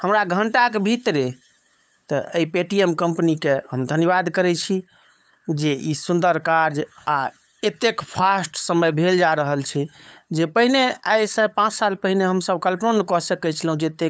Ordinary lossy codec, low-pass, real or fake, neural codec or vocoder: none; none; fake; codec, 16 kHz, 8 kbps, FunCodec, trained on LibriTTS, 25 frames a second